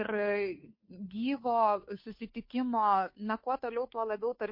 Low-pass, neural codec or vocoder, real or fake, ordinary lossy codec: 5.4 kHz; codec, 16 kHz, 2 kbps, FunCodec, trained on Chinese and English, 25 frames a second; fake; MP3, 32 kbps